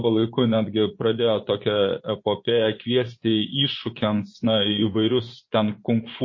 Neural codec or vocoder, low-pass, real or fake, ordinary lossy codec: vocoder, 24 kHz, 100 mel bands, Vocos; 7.2 kHz; fake; MP3, 32 kbps